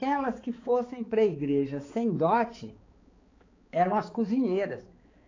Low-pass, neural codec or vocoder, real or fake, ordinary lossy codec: 7.2 kHz; codec, 16 kHz, 4 kbps, X-Codec, HuBERT features, trained on balanced general audio; fake; AAC, 32 kbps